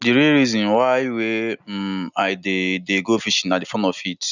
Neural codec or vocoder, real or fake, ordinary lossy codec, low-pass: none; real; none; 7.2 kHz